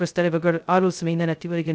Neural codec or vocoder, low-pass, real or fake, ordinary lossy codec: codec, 16 kHz, 0.2 kbps, FocalCodec; none; fake; none